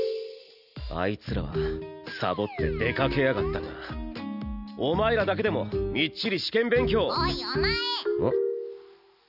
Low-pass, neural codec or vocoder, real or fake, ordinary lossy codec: 5.4 kHz; none; real; none